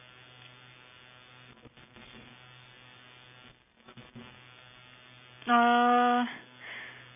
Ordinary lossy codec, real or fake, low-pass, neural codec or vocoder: none; real; 3.6 kHz; none